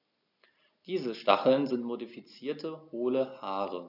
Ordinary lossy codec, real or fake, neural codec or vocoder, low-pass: none; real; none; 5.4 kHz